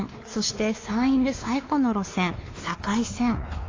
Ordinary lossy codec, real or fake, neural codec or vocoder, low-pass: AAC, 32 kbps; fake; codec, 16 kHz, 2 kbps, X-Codec, WavLM features, trained on Multilingual LibriSpeech; 7.2 kHz